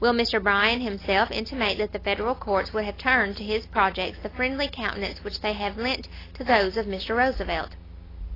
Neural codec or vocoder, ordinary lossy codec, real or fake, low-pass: none; AAC, 24 kbps; real; 5.4 kHz